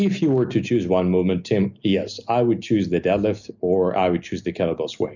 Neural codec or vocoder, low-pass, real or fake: none; 7.2 kHz; real